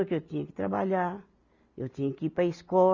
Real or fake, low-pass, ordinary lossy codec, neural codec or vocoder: real; 7.2 kHz; none; none